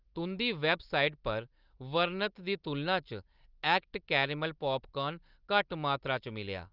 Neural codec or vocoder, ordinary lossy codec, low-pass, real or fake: none; Opus, 32 kbps; 5.4 kHz; real